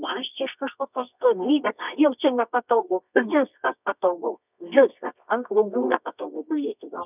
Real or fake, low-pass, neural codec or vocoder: fake; 3.6 kHz; codec, 24 kHz, 0.9 kbps, WavTokenizer, medium music audio release